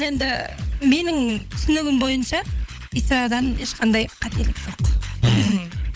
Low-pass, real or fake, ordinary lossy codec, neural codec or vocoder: none; fake; none; codec, 16 kHz, 16 kbps, FunCodec, trained on LibriTTS, 50 frames a second